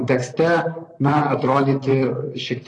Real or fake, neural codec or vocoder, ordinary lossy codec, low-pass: fake; vocoder, 44.1 kHz, 128 mel bands, Pupu-Vocoder; AAC, 32 kbps; 10.8 kHz